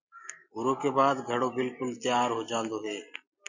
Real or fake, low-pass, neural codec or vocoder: real; 7.2 kHz; none